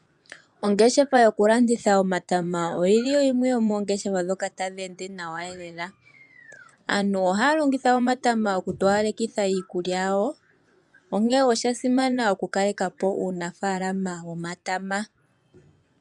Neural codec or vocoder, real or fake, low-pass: vocoder, 24 kHz, 100 mel bands, Vocos; fake; 10.8 kHz